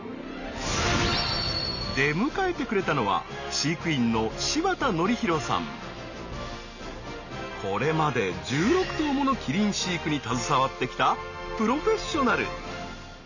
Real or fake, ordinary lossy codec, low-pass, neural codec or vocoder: real; none; 7.2 kHz; none